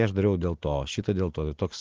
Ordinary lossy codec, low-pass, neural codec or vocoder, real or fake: Opus, 16 kbps; 7.2 kHz; none; real